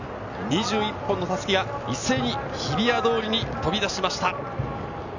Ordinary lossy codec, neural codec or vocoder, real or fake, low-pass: none; none; real; 7.2 kHz